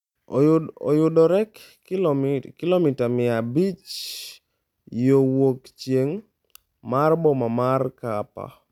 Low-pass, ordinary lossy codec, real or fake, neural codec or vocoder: 19.8 kHz; none; real; none